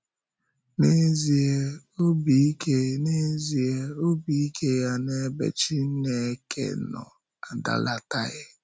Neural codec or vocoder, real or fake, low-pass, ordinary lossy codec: none; real; none; none